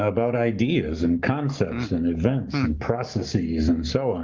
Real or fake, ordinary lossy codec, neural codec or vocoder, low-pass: fake; Opus, 32 kbps; codec, 44.1 kHz, 7.8 kbps, Pupu-Codec; 7.2 kHz